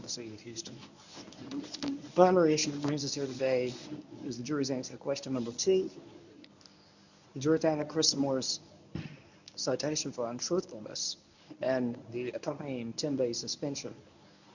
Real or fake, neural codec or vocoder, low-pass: fake; codec, 24 kHz, 0.9 kbps, WavTokenizer, medium speech release version 1; 7.2 kHz